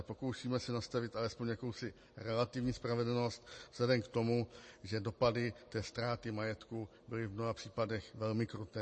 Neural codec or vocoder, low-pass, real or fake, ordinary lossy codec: none; 9.9 kHz; real; MP3, 32 kbps